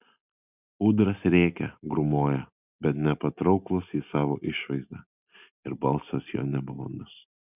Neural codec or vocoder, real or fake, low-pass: none; real; 3.6 kHz